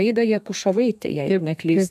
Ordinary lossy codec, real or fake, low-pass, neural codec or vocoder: MP3, 96 kbps; fake; 14.4 kHz; codec, 32 kHz, 1.9 kbps, SNAC